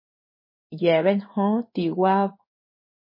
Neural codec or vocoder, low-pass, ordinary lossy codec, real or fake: codec, 16 kHz in and 24 kHz out, 1 kbps, XY-Tokenizer; 5.4 kHz; MP3, 24 kbps; fake